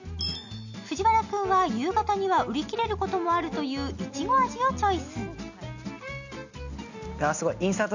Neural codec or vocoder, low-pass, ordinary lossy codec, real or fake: none; 7.2 kHz; none; real